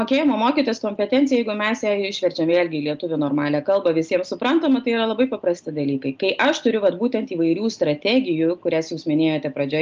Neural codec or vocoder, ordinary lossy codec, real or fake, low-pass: none; Opus, 24 kbps; real; 7.2 kHz